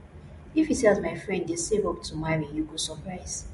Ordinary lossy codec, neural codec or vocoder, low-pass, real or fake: MP3, 48 kbps; none; 14.4 kHz; real